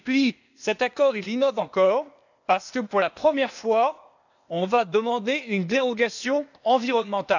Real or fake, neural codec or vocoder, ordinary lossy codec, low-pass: fake; codec, 16 kHz, 0.8 kbps, ZipCodec; none; 7.2 kHz